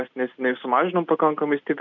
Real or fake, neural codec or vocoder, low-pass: real; none; 7.2 kHz